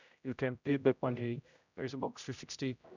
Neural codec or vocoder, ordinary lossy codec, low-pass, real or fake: codec, 16 kHz, 0.5 kbps, X-Codec, HuBERT features, trained on general audio; none; 7.2 kHz; fake